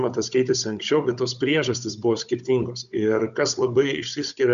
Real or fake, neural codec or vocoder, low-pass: fake; codec, 16 kHz, 16 kbps, FunCodec, trained on Chinese and English, 50 frames a second; 7.2 kHz